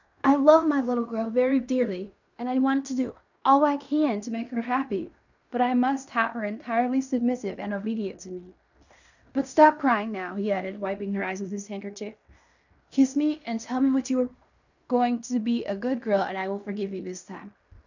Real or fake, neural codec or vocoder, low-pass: fake; codec, 16 kHz in and 24 kHz out, 0.9 kbps, LongCat-Audio-Codec, fine tuned four codebook decoder; 7.2 kHz